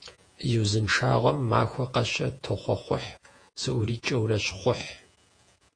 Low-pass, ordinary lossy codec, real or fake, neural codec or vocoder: 9.9 kHz; AAC, 48 kbps; fake; vocoder, 48 kHz, 128 mel bands, Vocos